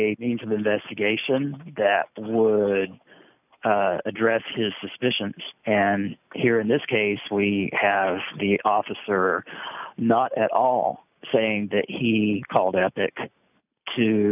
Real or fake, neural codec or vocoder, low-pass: real; none; 3.6 kHz